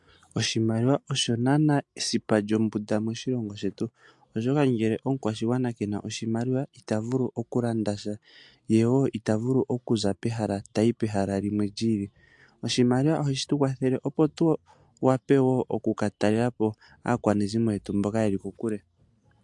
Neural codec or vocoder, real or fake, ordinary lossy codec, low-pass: none; real; MP3, 64 kbps; 10.8 kHz